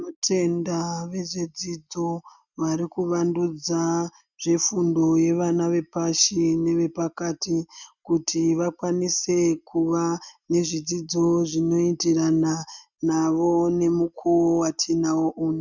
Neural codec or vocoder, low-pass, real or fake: none; 7.2 kHz; real